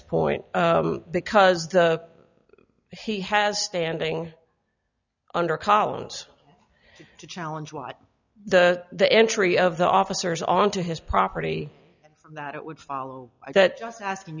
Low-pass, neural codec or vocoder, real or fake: 7.2 kHz; none; real